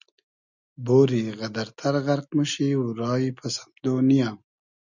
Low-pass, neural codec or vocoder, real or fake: 7.2 kHz; none; real